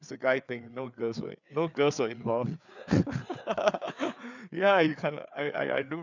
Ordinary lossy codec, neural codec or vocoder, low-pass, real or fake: none; codec, 16 kHz, 4 kbps, FreqCodec, larger model; 7.2 kHz; fake